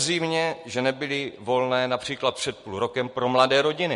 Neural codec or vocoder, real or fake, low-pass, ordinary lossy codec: none; real; 14.4 kHz; MP3, 48 kbps